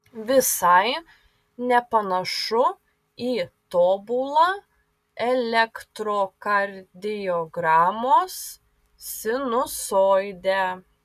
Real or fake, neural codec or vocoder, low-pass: real; none; 14.4 kHz